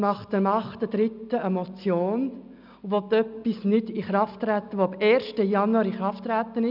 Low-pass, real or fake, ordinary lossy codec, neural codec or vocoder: 5.4 kHz; real; none; none